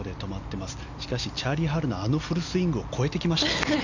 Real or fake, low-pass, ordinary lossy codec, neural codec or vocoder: real; 7.2 kHz; none; none